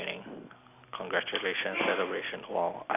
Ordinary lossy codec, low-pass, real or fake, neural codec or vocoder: none; 3.6 kHz; real; none